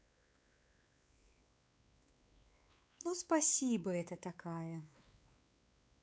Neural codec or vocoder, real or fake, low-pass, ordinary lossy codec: codec, 16 kHz, 4 kbps, X-Codec, WavLM features, trained on Multilingual LibriSpeech; fake; none; none